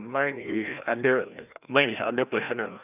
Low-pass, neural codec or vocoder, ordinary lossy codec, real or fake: 3.6 kHz; codec, 16 kHz, 1 kbps, FreqCodec, larger model; none; fake